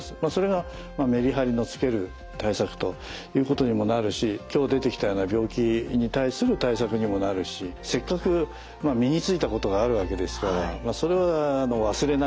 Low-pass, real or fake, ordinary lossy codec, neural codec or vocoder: none; real; none; none